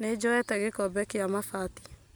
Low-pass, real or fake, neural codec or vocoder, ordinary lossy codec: none; real; none; none